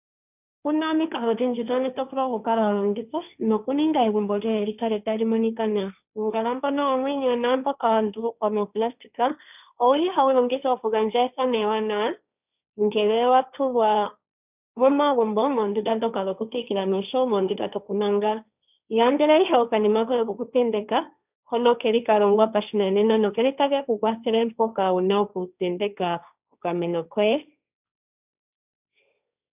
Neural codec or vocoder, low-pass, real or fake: codec, 16 kHz, 1.1 kbps, Voila-Tokenizer; 3.6 kHz; fake